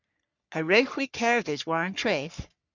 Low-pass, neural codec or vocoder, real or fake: 7.2 kHz; codec, 44.1 kHz, 3.4 kbps, Pupu-Codec; fake